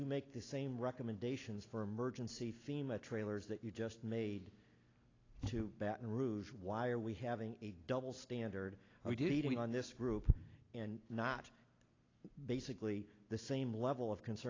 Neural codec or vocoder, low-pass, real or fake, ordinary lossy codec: none; 7.2 kHz; real; AAC, 32 kbps